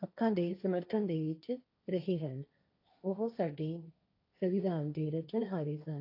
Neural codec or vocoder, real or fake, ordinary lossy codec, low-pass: codec, 16 kHz, 1.1 kbps, Voila-Tokenizer; fake; AAC, 32 kbps; 5.4 kHz